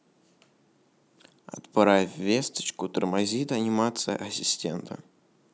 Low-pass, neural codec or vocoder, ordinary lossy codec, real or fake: none; none; none; real